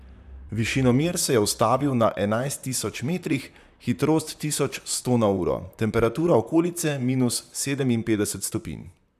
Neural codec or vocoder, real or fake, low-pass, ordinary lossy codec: vocoder, 44.1 kHz, 128 mel bands, Pupu-Vocoder; fake; 14.4 kHz; none